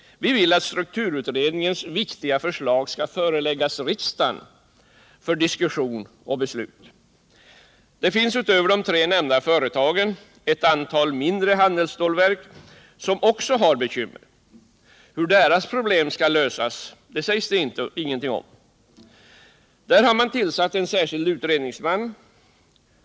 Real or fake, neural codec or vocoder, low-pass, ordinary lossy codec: real; none; none; none